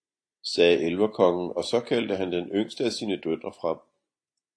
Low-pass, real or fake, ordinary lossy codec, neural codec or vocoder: 9.9 kHz; real; AAC, 48 kbps; none